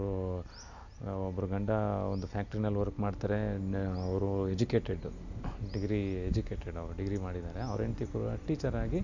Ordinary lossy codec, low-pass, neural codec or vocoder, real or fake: none; 7.2 kHz; none; real